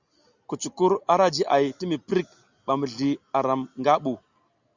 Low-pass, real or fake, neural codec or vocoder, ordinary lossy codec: 7.2 kHz; real; none; Opus, 64 kbps